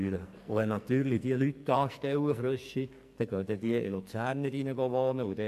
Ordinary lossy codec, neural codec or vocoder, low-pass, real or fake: AAC, 96 kbps; codec, 44.1 kHz, 2.6 kbps, SNAC; 14.4 kHz; fake